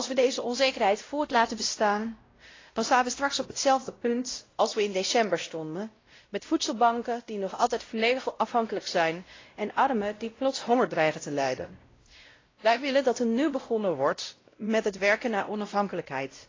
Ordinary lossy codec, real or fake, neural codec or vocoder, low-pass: AAC, 32 kbps; fake; codec, 16 kHz, 0.5 kbps, X-Codec, WavLM features, trained on Multilingual LibriSpeech; 7.2 kHz